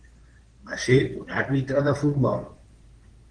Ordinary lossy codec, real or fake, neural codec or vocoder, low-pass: Opus, 16 kbps; fake; codec, 16 kHz in and 24 kHz out, 2.2 kbps, FireRedTTS-2 codec; 9.9 kHz